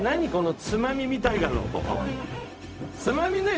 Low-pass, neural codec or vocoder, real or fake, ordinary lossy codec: none; codec, 16 kHz, 0.4 kbps, LongCat-Audio-Codec; fake; none